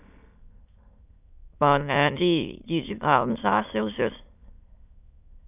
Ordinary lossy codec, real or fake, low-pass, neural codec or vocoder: none; fake; 3.6 kHz; autoencoder, 22.05 kHz, a latent of 192 numbers a frame, VITS, trained on many speakers